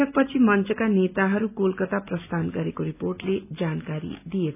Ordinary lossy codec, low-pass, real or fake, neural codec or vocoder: none; 3.6 kHz; real; none